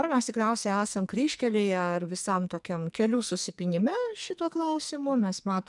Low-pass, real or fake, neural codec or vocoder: 10.8 kHz; fake; codec, 44.1 kHz, 2.6 kbps, SNAC